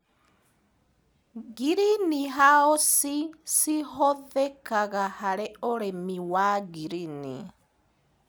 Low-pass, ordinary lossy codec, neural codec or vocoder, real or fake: none; none; none; real